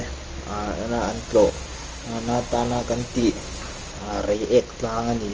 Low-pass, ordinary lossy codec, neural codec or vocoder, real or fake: 7.2 kHz; Opus, 24 kbps; none; real